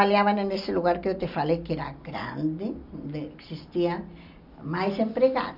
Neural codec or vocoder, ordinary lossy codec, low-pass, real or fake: none; none; 5.4 kHz; real